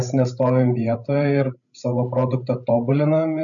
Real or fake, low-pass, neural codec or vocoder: fake; 7.2 kHz; codec, 16 kHz, 16 kbps, FreqCodec, larger model